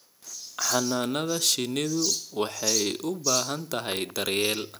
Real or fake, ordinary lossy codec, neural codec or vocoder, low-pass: real; none; none; none